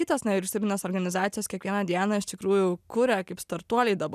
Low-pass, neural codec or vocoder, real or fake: 14.4 kHz; none; real